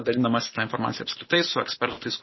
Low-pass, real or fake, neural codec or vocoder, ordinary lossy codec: 7.2 kHz; real; none; MP3, 24 kbps